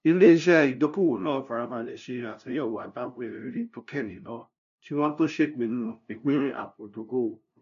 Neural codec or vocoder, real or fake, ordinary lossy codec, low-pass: codec, 16 kHz, 0.5 kbps, FunCodec, trained on LibriTTS, 25 frames a second; fake; none; 7.2 kHz